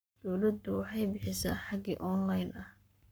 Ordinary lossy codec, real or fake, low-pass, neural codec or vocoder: none; fake; none; codec, 44.1 kHz, 7.8 kbps, Pupu-Codec